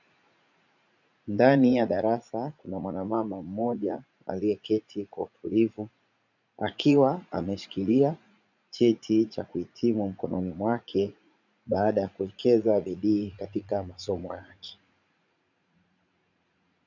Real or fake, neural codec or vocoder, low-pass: fake; vocoder, 44.1 kHz, 80 mel bands, Vocos; 7.2 kHz